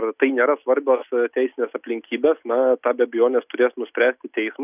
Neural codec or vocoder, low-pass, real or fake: none; 3.6 kHz; real